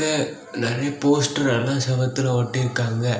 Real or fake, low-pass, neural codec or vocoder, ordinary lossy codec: real; none; none; none